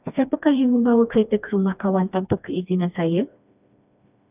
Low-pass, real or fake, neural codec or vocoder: 3.6 kHz; fake; codec, 16 kHz, 2 kbps, FreqCodec, smaller model